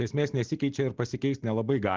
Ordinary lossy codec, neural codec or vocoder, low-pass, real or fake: Opus, 16 kbps; none; 7.2 kHz; real